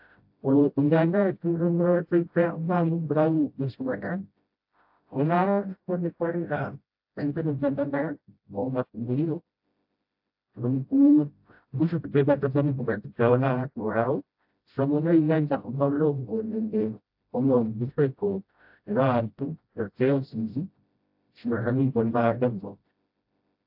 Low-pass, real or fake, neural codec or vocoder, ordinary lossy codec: 5.4 kHz; fake; codec, 16 kHz, 0.5 kbps, FreqCodec, smaller model; AAC, 48 kbps